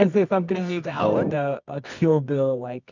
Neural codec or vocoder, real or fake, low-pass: codec, 24 kHz, 0.9 kbps, WavTokenizer, medium music audio release; fake; 7.2 kHz